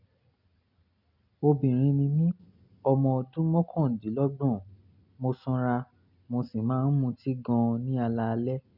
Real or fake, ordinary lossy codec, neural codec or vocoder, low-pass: real; none; none; 5.4 kHz